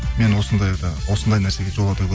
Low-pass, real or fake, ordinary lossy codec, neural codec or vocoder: none; real; none; none